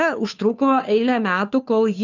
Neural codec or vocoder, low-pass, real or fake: codec, 16 kHz, 2 kbps, FunCodec, trained on Chinese and English, 25 frames a second; 7.2 kHz; fake